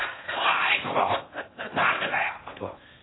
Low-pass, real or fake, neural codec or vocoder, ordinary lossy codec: 7.2 kHz; fake; codec, 16 kHz in and 24 kHz out, 0.8 kbps, FocalCodec, streaming, 65536 codes; AAC, 16 kbps